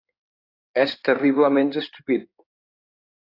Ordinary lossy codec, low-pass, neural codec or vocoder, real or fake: AAC, 24 kbps; 5.4 kHz; codec, 16 kHz in and 24 kHz out, 2.2 kbps, FireRedTTS-2 codec; fake